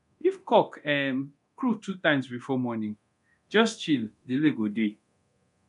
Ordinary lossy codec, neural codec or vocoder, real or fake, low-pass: none; codec, 24 kHz, 0.9 kbps, DualCodec; fake; 10.8 kHz